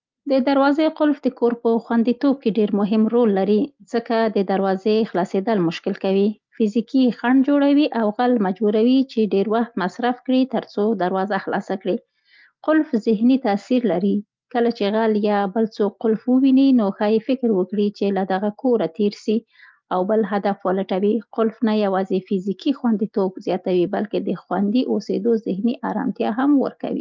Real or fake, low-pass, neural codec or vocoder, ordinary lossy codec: real; 7.2 kHz; none; Opus, 24 kbps